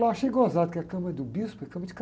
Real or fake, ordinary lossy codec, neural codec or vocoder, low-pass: real; none; none; none